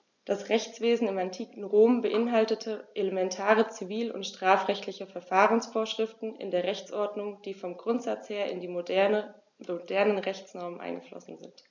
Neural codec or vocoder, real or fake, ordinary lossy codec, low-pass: none; real; none; 7.2 kHz